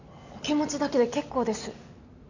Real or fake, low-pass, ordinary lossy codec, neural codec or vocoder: real; 7.2 kHz; none; none